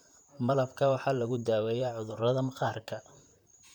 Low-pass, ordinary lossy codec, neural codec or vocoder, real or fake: 19.8 kHz; none; vocoder, 44.1 kHz, 128 mel bands, Pupu-Vocoder; fake